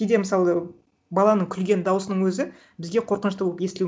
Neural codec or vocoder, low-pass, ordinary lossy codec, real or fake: none; none; none; real